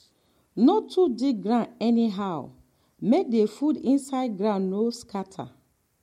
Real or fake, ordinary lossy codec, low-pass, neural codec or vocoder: real; MP3, 64 kbps; 19.8 kHz; none